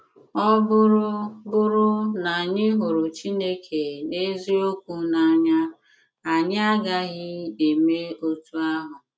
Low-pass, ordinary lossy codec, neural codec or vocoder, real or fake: none; none; none; real